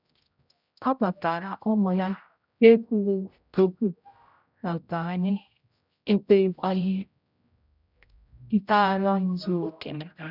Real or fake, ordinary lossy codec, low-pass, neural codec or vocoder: fake; none; 5.4 kHz; codec, 16 kHz, 0.5 kbps, X-Codec, HuBERT features, trained on general audio